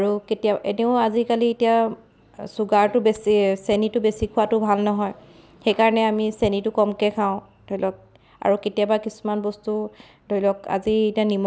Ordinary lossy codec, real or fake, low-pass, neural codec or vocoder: none; real; none; none